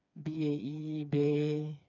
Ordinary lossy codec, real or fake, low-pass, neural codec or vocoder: none; fake; 7.2 kHz; codec, 16 kHz, 4 kbps, FreqCodec, smaller model